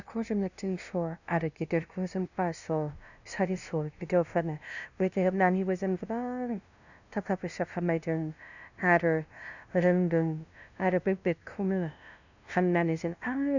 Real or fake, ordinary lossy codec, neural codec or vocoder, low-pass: fake; none; codec, 16 kHz, 0.5 kbps, FunCodec, trained on LibriTTS, 25 frames a second; 7.2 kHz